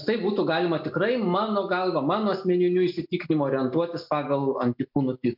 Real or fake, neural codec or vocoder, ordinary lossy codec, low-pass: real; none; MP3, 48 kbps; 5.4 kHz